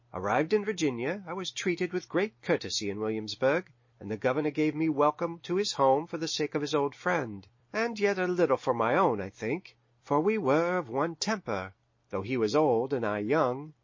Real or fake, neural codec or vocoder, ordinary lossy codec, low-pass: real; none; MP3, 32 kbps; 7.2 kHz